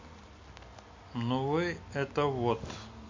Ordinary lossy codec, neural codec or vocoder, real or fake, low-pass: MP3, 48 kbps; none; real; 7.2 kHz